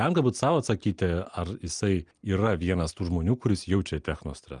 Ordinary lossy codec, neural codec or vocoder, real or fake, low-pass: Opus, 32 kbps; none; real; 9.9 kHz